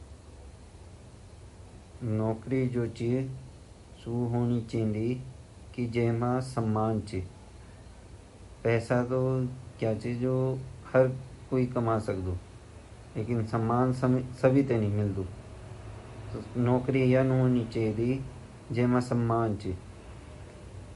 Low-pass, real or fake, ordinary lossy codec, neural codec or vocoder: 14.4 kHz; real; MP3, 48 kbps; none